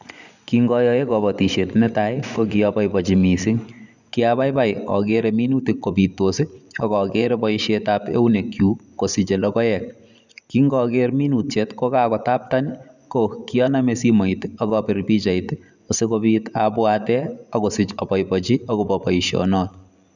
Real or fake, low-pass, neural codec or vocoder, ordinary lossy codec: fake; 7.2 kHz; vocoder, 24 kHz, 100 mel bands, Vocos; none